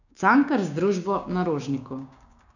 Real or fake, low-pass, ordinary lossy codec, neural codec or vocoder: fake; 7.2 kHz; none; codec, 16 kHz, 6 kbps, DAC